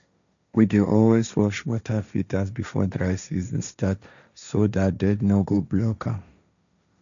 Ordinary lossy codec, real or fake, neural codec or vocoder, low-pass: none; fake; codec, 16 kHz, 1.1 kbps, Voila-Tokenizer; 7.2 kHz